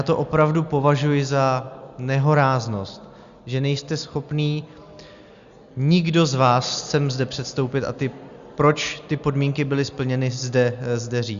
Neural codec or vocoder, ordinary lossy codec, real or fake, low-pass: none; Opus, 64 kbps; real; 7.2 kHz